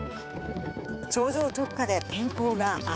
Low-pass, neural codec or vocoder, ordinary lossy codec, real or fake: none; codec, 16 kHz, 4 kbps, X-Codec, HuBERT features, trained on balanced general audio; none; fake